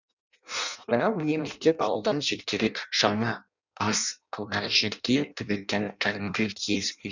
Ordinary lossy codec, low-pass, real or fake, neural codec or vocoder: none; 7.2 kHz; fake; codec, 16 kHz in and 24 kHz out, 0.6 kbps, FireRedTTS-2 codec